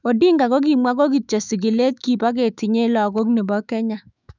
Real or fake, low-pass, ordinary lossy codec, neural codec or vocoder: fake; 7.2 kHz; none; autoencoder, 48 kHz, 128 numbers a frame, DAC-VAE, trained on Japanese speech